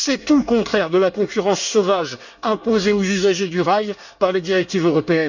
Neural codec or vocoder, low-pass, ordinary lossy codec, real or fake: codec, 24 kHz, 1 kbps, SNAC; 7.2 kHz; none; fake